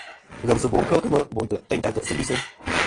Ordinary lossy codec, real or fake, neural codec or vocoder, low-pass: AAC, 32 kbps; fake; vocoder, 22.05 kHz, 80 mel bands, Vocos; 9.9 kHz